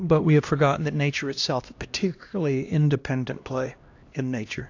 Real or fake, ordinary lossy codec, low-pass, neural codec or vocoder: fake; AAC, 48 kbps; 7.2 kHz; codec, 16 kHz, 1 kbps, X-Codec, HuBERT features, trained on LibriSpeech